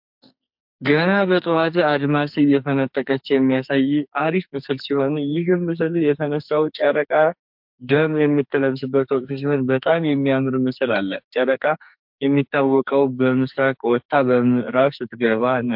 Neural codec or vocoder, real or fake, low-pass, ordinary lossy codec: codec, 44.1 kHz, 2.6 kbps, SNAC; fake; 5.4 kHz; MP3, 48 kbps